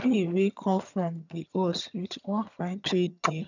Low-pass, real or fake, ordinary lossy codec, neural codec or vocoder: 7.2 kHz; fake; none; vocoder, 22.05 kHz, 80 mel bands, HiFi-GAN